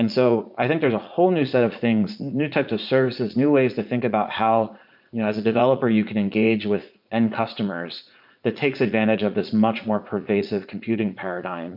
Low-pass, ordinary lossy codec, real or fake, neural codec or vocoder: 5.4 kHz; MP3, 48 kbps; fake; vocoder, 44.1 kHz, 80 mel bands, Vocos